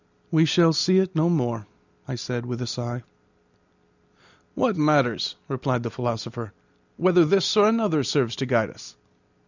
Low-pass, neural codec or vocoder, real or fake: 7.2 kHz; none; real